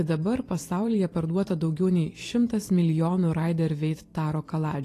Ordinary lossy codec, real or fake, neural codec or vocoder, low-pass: AAC, 48 kbps; fake; vocoder, 44.1 kHz, 128 mel bands every 512 samples, BigVGAN v2; 14.4 kHz